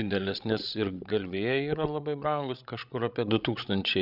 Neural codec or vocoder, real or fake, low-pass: codec, 16 kHz, 16 kbps, FreqCodec, larger model; fake; 5.4 kHz